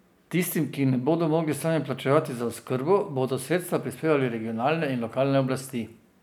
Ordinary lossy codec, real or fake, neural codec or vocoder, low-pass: none; real; none; none